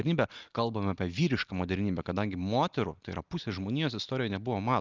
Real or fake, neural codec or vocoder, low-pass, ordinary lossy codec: real; none; 7.2 kHz; Opus, 32 kbps